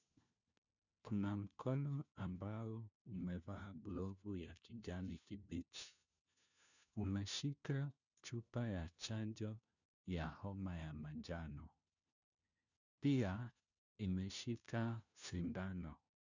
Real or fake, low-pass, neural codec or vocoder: fake; 7.2 kHz; codec, 16 kHz, 1 kbps, FunCodec, trained on LibriTTS, 50 frames a second